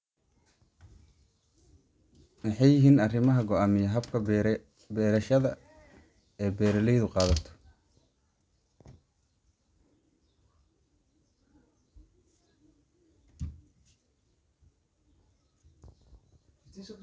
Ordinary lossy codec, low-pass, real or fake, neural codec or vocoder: none; none; real; none